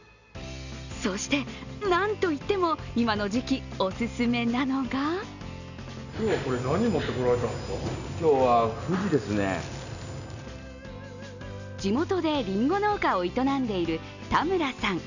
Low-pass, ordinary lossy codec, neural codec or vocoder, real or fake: 7.2 kHz; none; none; real